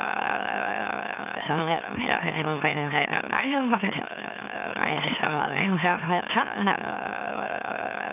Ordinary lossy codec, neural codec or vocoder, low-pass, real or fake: none; autoencoder, 44.1 kHz, a latent of 192 numbers a frame, MeloTTS; 3.6 kHz; fake